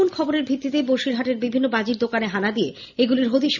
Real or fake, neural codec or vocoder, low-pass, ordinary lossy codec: real; none; 7.2 kHz; none